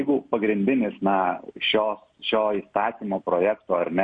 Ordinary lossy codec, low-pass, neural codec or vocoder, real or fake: MP3, 48 kbps; 9.9 kHz; none; real